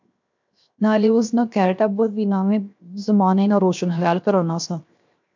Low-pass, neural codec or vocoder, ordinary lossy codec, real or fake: 7.2 kHz; codec, 16 kHz, 0.7 kbps, FocalCodec; MP3, 64 kbps; fake